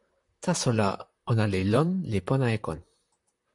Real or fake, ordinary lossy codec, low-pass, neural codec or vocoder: fake; AAC, 64 kbps; 10.8 kHz; vocoder, 44.1 kHz, 128 mel bands, Pupu-Vocoder